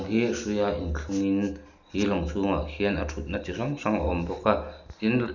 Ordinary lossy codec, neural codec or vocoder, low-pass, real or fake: none; none; 7.2 kHz; real